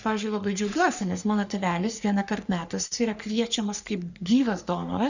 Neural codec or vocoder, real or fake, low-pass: codec, 44.1 kHz, 3.4 kbps, Pupu-Codec; fake; 7.2 kHz